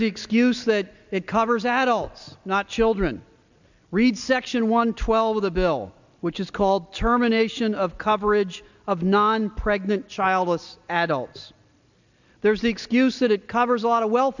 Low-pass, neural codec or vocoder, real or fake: 7.2 kHz; none; real